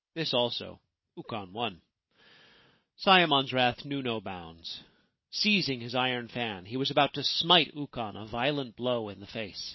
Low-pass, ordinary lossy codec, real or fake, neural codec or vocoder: 7.2 kHz; MP3, 24 kbps; real; none